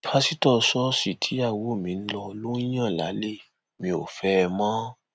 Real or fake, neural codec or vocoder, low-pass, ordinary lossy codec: real; none; none; none